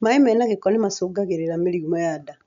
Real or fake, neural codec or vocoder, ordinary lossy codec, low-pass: real; none; none; 7.2 kHz